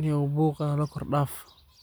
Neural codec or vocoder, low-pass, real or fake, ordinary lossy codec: none; none; real; none